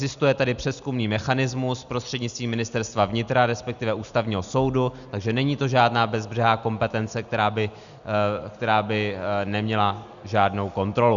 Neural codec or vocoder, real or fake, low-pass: none; real; 7.2 kHz